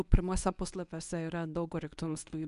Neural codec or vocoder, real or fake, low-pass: codec, 24 kHz, 0.9 kbps, WavTokenizer, medium speech release version 1; fake; 10.8 kHz